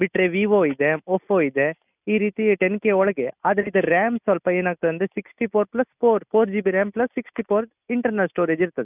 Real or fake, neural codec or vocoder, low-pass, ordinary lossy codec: real; none; 3.6 kHz; none